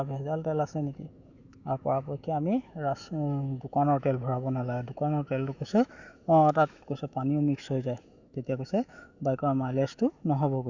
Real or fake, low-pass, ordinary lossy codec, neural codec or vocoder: fake; 7.2 kHz; Opus, 64 kbps; autoencoder, 48 kHz, 128 numbers a frame, DAC-VAE, trained on Japanese speech